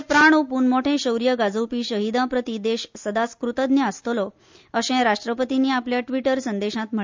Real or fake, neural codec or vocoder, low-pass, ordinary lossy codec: real; none; 7.2 kHz; MP3, 64 kbps